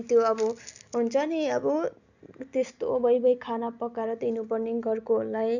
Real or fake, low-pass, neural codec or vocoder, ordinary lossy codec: real; 7.2 kHz; none; none